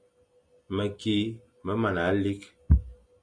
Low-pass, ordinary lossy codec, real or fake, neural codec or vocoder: 9.9 kHz; MP3, 96 kbps; real; none